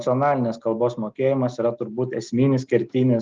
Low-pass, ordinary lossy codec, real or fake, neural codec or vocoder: 7.2 kHz; Opus, 32 kbps; real; none